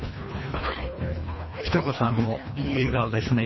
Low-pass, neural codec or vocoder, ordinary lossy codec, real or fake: 7.2 kHz; codec, 24 kHz, 1.5 kbps, HILCodec; MP3, 24 kbps; fake